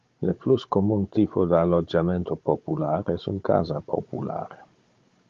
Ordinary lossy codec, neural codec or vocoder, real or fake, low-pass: Opus, 32 kbps; codec, 16 kHz, 16 kbps, FunCodec, trained on Chinese and English, 50 frames a second; fake; 7.2 kHz